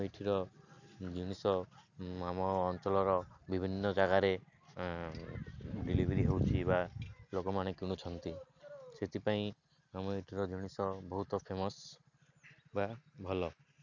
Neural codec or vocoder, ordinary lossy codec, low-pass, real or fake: none; none; 7.2 kHz; real